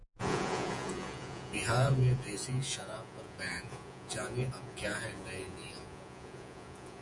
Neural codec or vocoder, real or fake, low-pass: vocoder, 48 kHz, 128 mel bands, Vocos; fake; 10.8 kHz